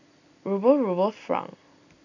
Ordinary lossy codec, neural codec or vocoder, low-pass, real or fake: none; none; 7.2 kHz; real